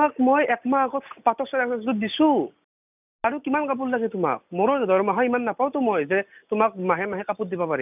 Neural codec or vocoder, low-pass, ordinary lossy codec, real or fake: none; 3.6 kHz; none; real